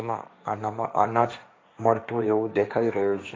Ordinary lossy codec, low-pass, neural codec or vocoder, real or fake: none; none; codec, 16 kHz, 1.1 kbps, Voila-Tokenizer; fake